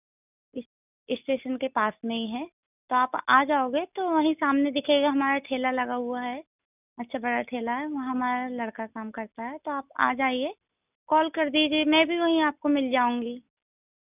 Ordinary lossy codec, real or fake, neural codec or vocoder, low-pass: none; real; none; 3.6 kHz